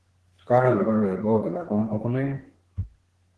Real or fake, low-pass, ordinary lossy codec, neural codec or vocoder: fake; 10.8 kHz; Opus, 16 kbps; codec, 24 kHz, 1 kbps, SNAC